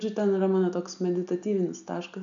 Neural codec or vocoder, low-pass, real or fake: none; 7.2 kHz; real